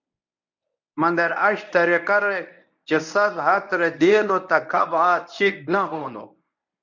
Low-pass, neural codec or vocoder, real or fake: 7.2 kHz; codec, 24 kHz, 0.9 kbps, WavTokenizer, medium speech release version 1; fake